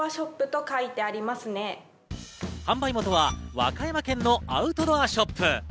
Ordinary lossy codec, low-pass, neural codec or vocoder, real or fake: none; none; none; real